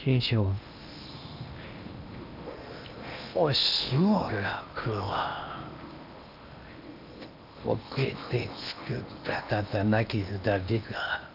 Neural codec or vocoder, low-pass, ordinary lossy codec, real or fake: codec, 16 kHz in and 24 kHz out, 0.8 kbps, FocalCodec, streaming, 65536 codes; 5.4 kHz; AAC, 48 kbps; fake